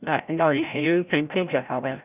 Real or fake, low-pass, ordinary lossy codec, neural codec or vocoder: fake; 3.6 kHz; none; codec, 16 kHz, 0.5 kbps, FreqCodec, larger model